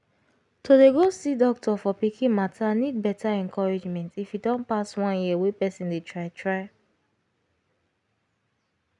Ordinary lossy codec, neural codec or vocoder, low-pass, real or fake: none; none; 10.8 kHz; real